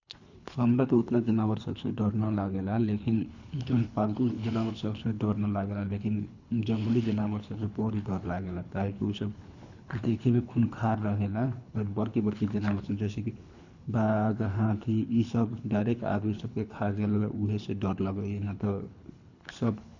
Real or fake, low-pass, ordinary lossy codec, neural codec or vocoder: fake; 7.2 kHz; none; codec, 24 kHz, 3 kbps, HILCodec